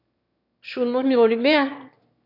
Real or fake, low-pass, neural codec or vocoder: fake; 5.4 kHz; autoencoder, 22.05 kHz, a latent of 192 numbers a frame, VITS, trained on one speaker